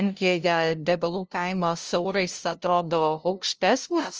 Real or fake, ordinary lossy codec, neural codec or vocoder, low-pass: fake; Opus, 24 kbps; codec, 16 kHz, 0.5 kbps, FunCodec, trained on LibriTTS, 25 frames a second; 7.2 kHz